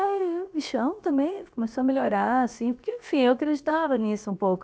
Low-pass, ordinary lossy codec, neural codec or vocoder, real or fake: none; none; codec, 16 kHz, about 1 kbps, DyCAST, with the encoder's durations; fake